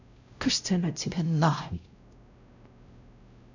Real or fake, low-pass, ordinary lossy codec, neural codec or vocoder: fake; 7.2 kHz; none; codec, 16 kHz, 0.5 kbps, X-Codec, WavLM features, trained on Multilingual LibriSpeech